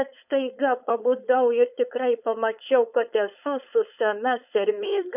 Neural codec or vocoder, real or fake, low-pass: codec, 16 kHz, 4.8 kbps, FACodec; fake; 3.6 kHz